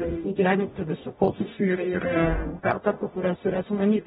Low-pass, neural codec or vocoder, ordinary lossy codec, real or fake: 19.8 kHz; codec, 44.1 kHz, 0.9 kbps, DAC; AAC, 16 kbps; fake